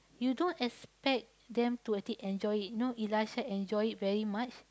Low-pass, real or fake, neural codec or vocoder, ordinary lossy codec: none; real; none; none